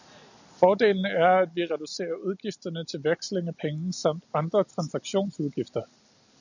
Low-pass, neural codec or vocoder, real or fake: 7.2 kHz; none; real